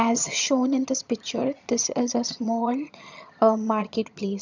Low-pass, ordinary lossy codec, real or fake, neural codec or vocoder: 7.2 kHz; none; fake; vocoder, 22.05 kHz, 80 mel bands, HiFi-GAN